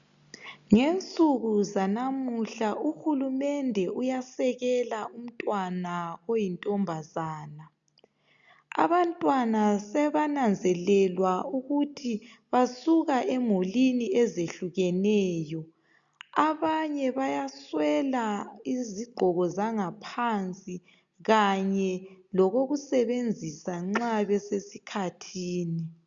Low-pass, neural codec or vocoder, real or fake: 7.2 kHz; none; real